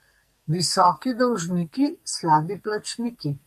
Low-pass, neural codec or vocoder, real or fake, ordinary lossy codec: 14.4 kHz; codec, 44.1 kHz, 2.6 kbps, SNAC; fake; MP3, 64 kbps